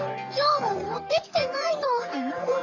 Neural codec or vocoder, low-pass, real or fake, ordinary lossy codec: codec, 44.1 kHz, 3.4 kbps, Pupu-Codec; 7.2 kHz; fake; none